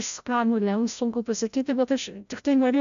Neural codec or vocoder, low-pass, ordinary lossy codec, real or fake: codec, 16 kHz, 0.5 kbps, FreqCodec, larger model; 7.2 kHz; AAC, 96 kbps; fake